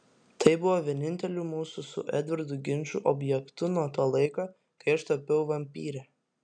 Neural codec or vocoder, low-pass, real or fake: none; 9.9 kHz; real